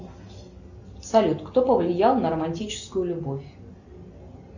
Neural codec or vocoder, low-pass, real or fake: none; 7.2 kHz; real